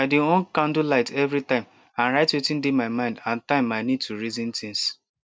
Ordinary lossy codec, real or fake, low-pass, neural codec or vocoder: none; real; none; none